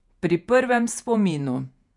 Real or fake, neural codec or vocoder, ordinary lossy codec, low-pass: fake; vocoder, 48 kHz, 128 mel bands, Vocos; none; 10.8 kHz